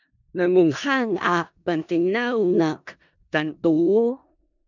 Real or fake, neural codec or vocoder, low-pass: fake; codec, 16 kHz in and 24 kHz out, 0.4 kbps, LongCat-Audio-Codec, four codebook decoder; 7.2 kHz